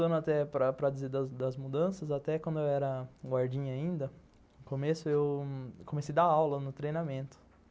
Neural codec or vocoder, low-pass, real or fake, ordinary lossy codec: none; none; real; none